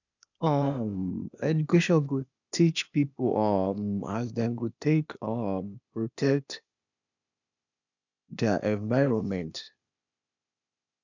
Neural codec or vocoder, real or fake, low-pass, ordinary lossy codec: codec, 16 kHz, 0.8 kbps, ZipCodec; fake; 7.2 kHz; none